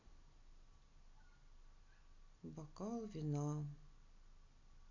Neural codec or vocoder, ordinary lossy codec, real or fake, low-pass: none; none; real; 7.2 kHz